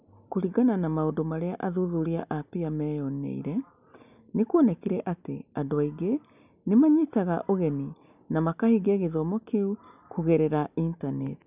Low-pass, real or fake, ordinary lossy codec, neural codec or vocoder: 3.6 kHz; real; none; none